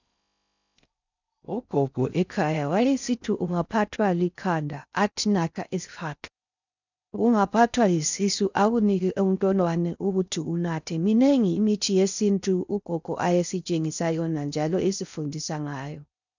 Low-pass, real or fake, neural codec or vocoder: 7.2 kHz; fake; codec, 16 kHz in and 24 kHz out, 0.6 kbps, FocalCodec, streaming, 4096 codes